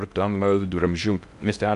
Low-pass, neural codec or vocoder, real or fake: 10.8 kHz; codec, 16 kHz in and 24 kHz out, 0.6 kbps, FocalCodec, streaming, 4096 codes; fake